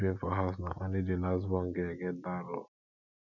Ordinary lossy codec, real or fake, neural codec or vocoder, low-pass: none; real; none; 7.2 kHz